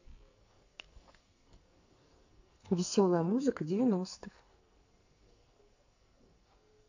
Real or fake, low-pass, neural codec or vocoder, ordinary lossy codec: fake; 7.2 kHz; codec, 44.1 kHz, 2.6 kbps, SNAC; AAC, 48 kbps